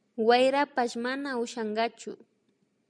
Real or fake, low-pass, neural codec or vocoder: real; 9.9 kHz; none